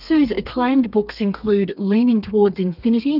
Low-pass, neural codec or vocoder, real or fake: 5.4 kHz; codec, 32 kHz, 1.9 kbps, SNAC; fake